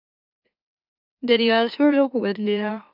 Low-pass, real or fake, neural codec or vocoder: 5.4 kHz; fake; autoencoder, 44.1 kHz, a latent of 192 numbers a frame, MeloTTS